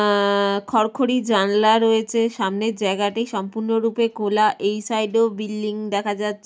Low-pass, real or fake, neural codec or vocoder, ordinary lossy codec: none; real; none; none